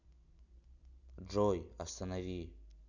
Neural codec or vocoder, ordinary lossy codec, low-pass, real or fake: none; none; 7.2 kHz; real